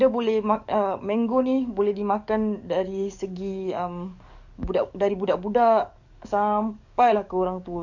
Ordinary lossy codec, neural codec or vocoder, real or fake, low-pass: none; codec, 44.1 kHz, 7.8 kbps, DAC; fake; 7.2 kHz